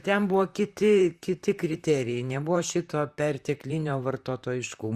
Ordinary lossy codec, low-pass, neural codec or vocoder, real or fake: Opus, 64 kbps; 14.4 kHz; vocoder, 44.1 kHz, 128 mel bands, Pupu-Vocoder; fake